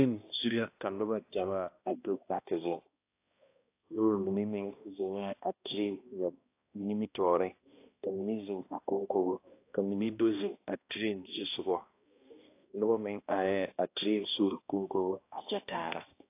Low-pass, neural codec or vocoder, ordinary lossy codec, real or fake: 3.6 kHz; codec, 16 kHz, 1 kbps, X-Codec, HuBERT features, trained on balanced general audio; AAC, 24 kbps; fake